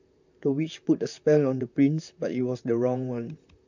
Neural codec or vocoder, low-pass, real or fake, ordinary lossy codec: vocoder, 44.1 kHz, 128 mel bands, Pupu-Vocoder; 7.2 kHz; fake; none